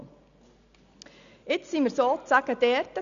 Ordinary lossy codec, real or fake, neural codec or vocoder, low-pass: none; real; none; 7.2 kHz